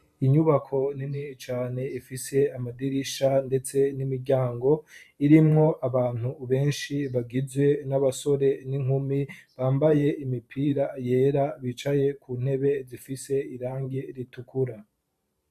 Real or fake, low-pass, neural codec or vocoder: fake; 14.4 kHz; vocoder, 44.1 kHz, 128 mel bands every 512 samples, BigVGAN v2